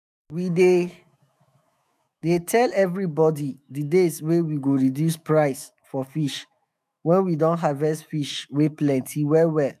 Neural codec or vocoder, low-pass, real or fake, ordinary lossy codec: autoencoder, 48 kHz, 128 numbers a frame, DAC-VAE, trained on Japanese speech; 14.4 kHz; fake; none